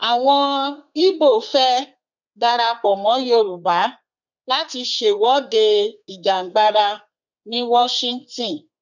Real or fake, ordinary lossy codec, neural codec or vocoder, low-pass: fake; none; codec, 32 kHz, 1.9 kbps, SNAC; 7.2 kHz